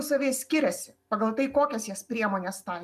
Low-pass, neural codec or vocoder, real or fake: 14.4 kHz; none; real